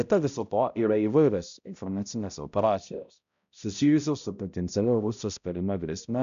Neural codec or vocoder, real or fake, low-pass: codec, 16 kHz, 0.5 kbps, X-Codec, HuBERT features, trained on balanced general audio; fake; 7.2 kHz